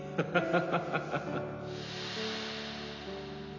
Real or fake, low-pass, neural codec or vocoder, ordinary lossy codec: real; 7.2 kHz; none; none